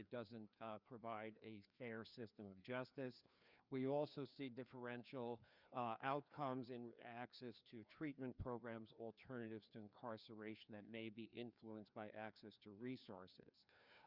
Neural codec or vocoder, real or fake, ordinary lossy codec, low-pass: codec, 16 kHz, 2 kbps, FreqCodec, larger model; fake; Opus, 64 kbps; 5.4 kHz